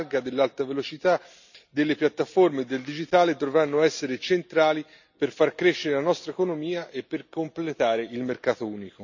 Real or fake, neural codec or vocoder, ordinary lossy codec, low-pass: real; none; none; 7.2 kHz